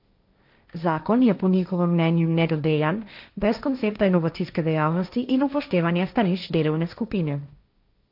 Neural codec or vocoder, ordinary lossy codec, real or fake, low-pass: codec, 16 kHz, 1.1 kbps, Voila-Tokenizer; AAC, 48 kbps; fake; 5.4 kHz